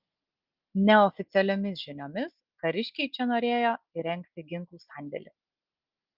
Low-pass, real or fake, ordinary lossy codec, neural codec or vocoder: 5.4 kHz; real; Opus, 32 kbps; none